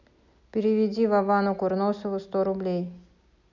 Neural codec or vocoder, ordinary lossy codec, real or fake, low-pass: none; none; real; 7.2 kHz